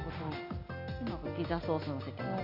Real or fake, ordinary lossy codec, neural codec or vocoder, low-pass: real; none; none; 5.4 kHz